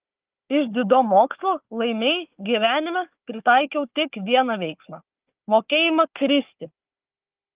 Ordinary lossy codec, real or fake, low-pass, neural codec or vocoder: Opus, 32 kbps; fake; 3.6 kHz; codec, 16 kHz, 4 kbps, FunCodec, trained on Chinese and English, 50 frames a second